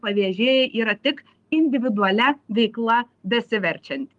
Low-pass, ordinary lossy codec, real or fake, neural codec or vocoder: 10.8 kHz; Opus, 32 kbps; real; none